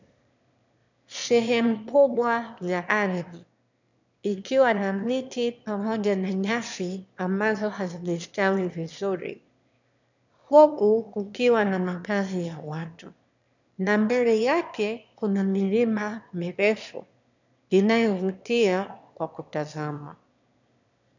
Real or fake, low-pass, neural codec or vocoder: fake; 7.2 kHz; autoencoder, 22.05 kHz, a latent of 192 numbers a frame, VITS, trained on one speaker